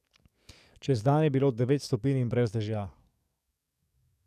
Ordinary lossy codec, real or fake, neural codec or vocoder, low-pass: none; fake; codec, 44.1 kHz, 7.8 kbps, DAC; 14.4 kHz